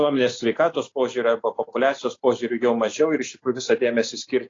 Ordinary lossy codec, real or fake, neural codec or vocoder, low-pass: AAC, 32 kbps; real; none; 7.2 kHz